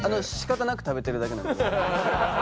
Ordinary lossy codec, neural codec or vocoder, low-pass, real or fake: none; none; none; real